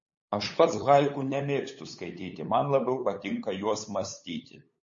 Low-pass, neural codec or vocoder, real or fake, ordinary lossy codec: 7.2 kHz; codec, 16 kHz, 8 kbps, FunCodec, trained on LibriTTS, 25 frames a second; fake; MP3, 32 kbps